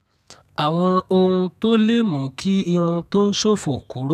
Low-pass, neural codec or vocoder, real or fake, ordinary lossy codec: 14.4 kHz; codec, 32 kHz, 1.9 kbps, SNAC; fake; none